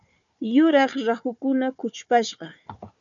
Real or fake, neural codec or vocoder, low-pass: fake; codec, 16 kHz, 4 kbps, FunCodec, trained on Chinese and English, 50 frames a second; 7.2 kHz